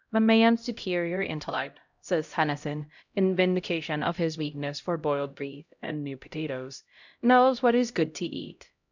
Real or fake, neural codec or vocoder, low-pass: fake; codec, 16 kHz, 0.5 kbps, X-Codec, HuBERT features, trained on LibriSpeech; 7.2 kHz